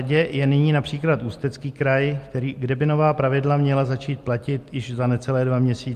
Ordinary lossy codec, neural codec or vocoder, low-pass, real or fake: Opus, 32 kbps; none; 14.4 kHz; real